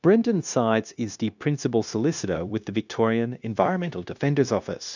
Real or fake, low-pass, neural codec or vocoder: fake; 7.2 kHz; codec, 16 kHz, 0.9 kbps, LongCat-Audio-Codec